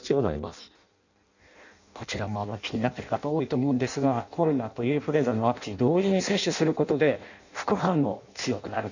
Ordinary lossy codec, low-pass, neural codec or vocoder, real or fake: none; 7.2 kHz; codec, 16 kHz in and 24 kHz out, 0.6 kbps, FireRedTTS-2 codec; fake